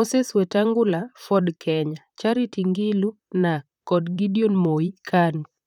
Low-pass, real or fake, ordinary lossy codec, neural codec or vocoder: 19.8 kHz; fake; none; vocoder, 44.1 kHz, 128 mel bands, Pupu-Vocoder